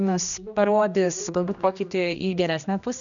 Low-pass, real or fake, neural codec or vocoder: 7.2 kHz; fake; codec, 16 kHz, 1 kbps, X-Codec, HuBERT features, trained on general audio